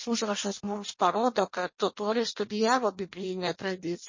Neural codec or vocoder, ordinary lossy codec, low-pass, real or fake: codec, 16 kHz in and 24 kHz out, 0.6 kbps, FireRedTTS-2 codec; MP3, 32 kbps; 7.2 kHz; fake